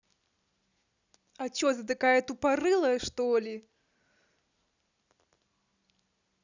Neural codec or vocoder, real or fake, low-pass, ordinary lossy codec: none; real; 7.2 kHz; none